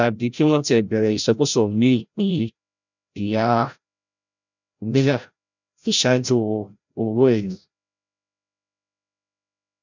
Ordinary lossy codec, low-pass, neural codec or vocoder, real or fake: none; 7.2 kHz; codec, 16 kHz, 0.5 kbps, FreqCodec, larger model; fake